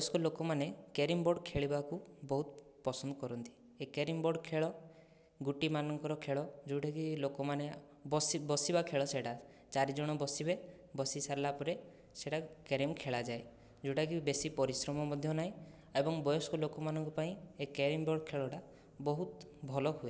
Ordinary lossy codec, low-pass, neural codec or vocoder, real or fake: none; none; none; real